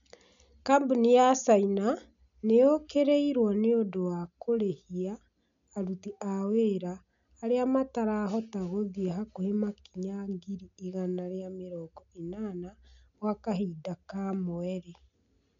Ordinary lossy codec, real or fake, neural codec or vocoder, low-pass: none; real; none; 7.2 kHz